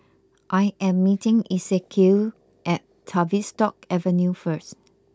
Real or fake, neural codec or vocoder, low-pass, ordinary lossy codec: real; none; none; none